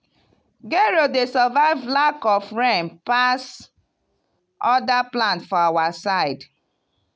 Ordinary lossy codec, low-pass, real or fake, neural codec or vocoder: none; none; real; none